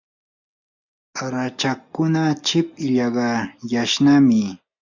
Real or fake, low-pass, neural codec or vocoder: real; 7.2 kHz; none